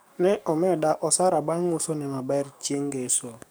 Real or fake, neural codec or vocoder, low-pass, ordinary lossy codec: fake; codec, 44.1 kHz, 7.8 kbps, DAC; none; none